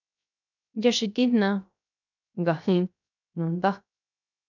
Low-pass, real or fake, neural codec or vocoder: 7.2 kHz; fake; codec, 16 kHz, 0.3 kbps, FocalCodec